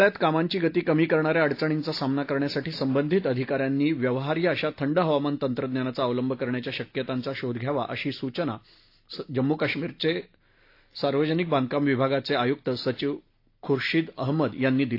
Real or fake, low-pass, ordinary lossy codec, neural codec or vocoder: real; 5.4 kHz; AAC, 32 kbps; none